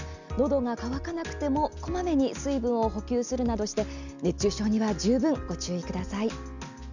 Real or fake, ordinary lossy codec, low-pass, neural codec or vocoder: real; none; 7.2 kHz; none